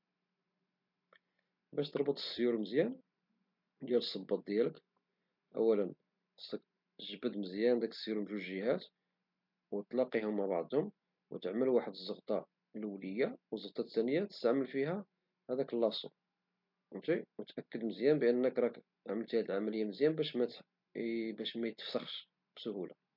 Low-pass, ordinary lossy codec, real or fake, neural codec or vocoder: 5.4 kHz; MP3, 48 kbps; real; none